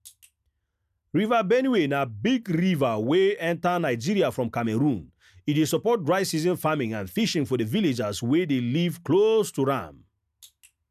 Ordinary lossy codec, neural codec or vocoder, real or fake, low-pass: none; none; real; 14.4 kHz